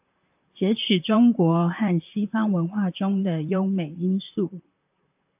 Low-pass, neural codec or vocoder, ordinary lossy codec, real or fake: 3.6 kHz; vocoder, 44.1 kHz, 128 mel bands, Pupu-Vocoder; AAC, 32 kbps; fake